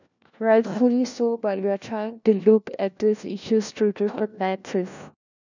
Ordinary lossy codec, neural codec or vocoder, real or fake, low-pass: none; codec, 16 kHz, 1 kbps, FunCodec, trained on LibriTTS, 50 frames a second; fake; 7.2 kHz